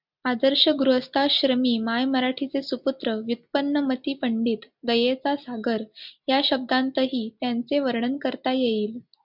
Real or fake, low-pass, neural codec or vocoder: real; 5.4 kHz; none